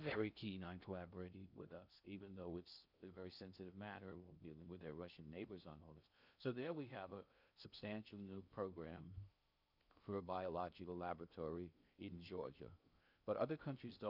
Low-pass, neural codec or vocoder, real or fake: 5.4 kHz; codec, 16 kHz in and 24 kHz out, 0.6 kbps, FocalCodec, streaming, 2048 codes; fake